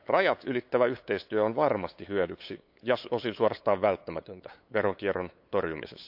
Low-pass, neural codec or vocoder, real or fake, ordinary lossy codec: 5.4 kHz; codec, 16 kHz, 8 kbps, FunCodec, trained on LibriTTS, 25 frames a second; fake; AAC, 48 kbps